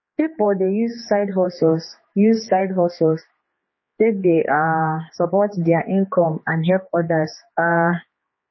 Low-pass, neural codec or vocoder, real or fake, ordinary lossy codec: 7.2 kHz; codec, 16 kHz, 4 kbps, X-Codec, HuBERT features, trained on general audio; fake; MP3, 24 kbps